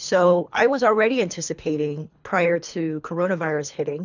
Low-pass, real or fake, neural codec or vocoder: 7.2 kHz; fake; codec, 24 kHz, 3 kbps, HILCodec